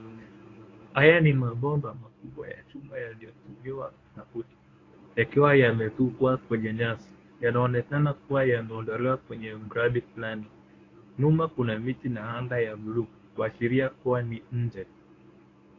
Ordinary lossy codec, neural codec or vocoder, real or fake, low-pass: AAC, 32 kbps; codec, 24 kHz, 0.9 kbps, WavTokenizer, medium speech release version 1; fake; 7.2 kHz